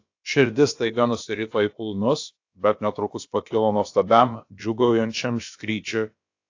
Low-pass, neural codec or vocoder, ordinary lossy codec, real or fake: 7.2 kHz; codec, 16 kHz, about 1 kbps, DyCAST, with the encoder's durations; AAC, 48 kbps; fake